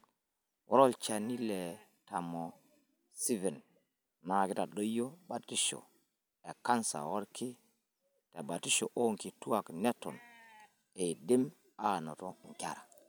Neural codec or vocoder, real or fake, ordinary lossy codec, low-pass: none; real; none; none